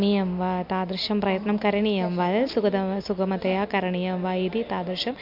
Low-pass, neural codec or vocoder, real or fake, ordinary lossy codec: 5.4 kHz; none; real; MP3, 48 kbps